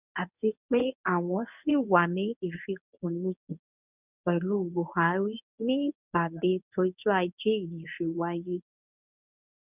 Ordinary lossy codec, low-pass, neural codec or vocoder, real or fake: none; 3.6 kHz; codec, 24 kHz, 0.9 kbps, WavTokenizer, medium speech release version 1; fake